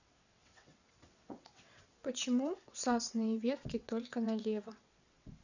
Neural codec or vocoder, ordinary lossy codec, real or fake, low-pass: vocoder, 22.05 kHz, 80 mel bands, WaveNeXt; none; fake; 7.2 kHz